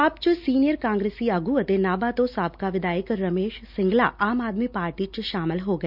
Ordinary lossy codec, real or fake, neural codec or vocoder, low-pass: none; real; none; 5.4 kHz